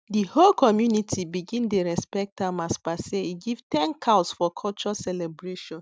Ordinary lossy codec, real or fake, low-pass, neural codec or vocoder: none; real; none; none